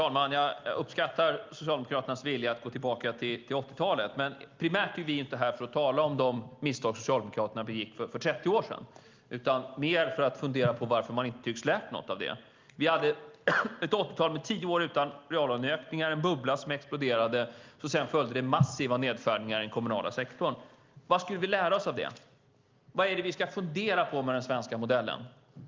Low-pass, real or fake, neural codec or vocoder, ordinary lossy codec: 7.2 kHz; real; none; Opus, 24 kbps